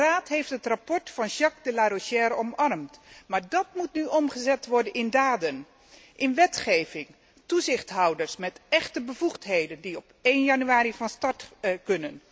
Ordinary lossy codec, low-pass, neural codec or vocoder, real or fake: none; none; none; real